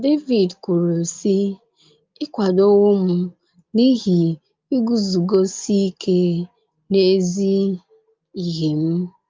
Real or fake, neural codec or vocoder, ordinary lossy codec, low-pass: real; none; Opus, 24 kbps; 7.2 kHz